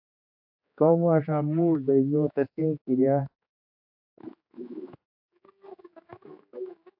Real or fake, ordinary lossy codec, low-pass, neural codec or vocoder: fake; AAC, 48 kbps; 5.4 kHz; codec, 16 kHz, 4 kbps, X-Codec, HuBERT features, trained on general audio